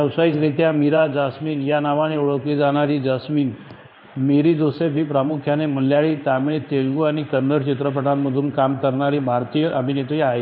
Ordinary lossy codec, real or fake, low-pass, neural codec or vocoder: none; fake; 5.4 kHz; codec, 16 kHz in and 24 kHz out, 1 kbps, XY-Tokenizer